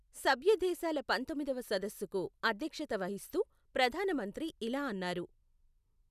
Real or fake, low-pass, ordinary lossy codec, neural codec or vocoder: real; 14.4 kHz; none; none